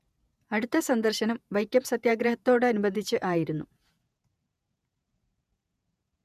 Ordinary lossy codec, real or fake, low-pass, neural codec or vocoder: none; fake; 14.4 kHz; vocoder, 48 kHz, 128 mel bands, Vocos